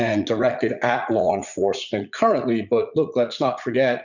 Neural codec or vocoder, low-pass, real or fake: vocoder, 44.1 kHz, 80 mel bands, Vocos; 7.2 kHz; fake